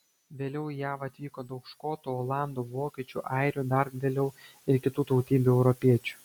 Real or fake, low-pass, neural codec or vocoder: real; 19.8 kHz; none